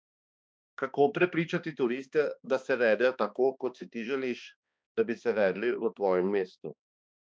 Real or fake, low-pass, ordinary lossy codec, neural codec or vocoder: fake; none; none; codec, 16 kHz, 2 kbps, X-Codec, HuBERT features, trained on balanced general audio